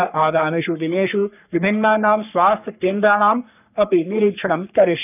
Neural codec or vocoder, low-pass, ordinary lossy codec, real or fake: codec, 44.1 kHz, 3.4 kbps, Pupu-Codec; 3.6 kHz; none; fake